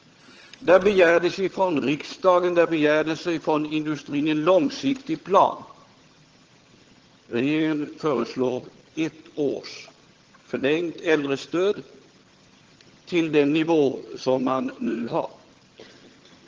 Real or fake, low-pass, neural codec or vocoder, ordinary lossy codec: fake; 7.2 kHz; vocoder, 22.05 kHz, 80 mel bands, HiFi-GAN; Opus, 16 kbps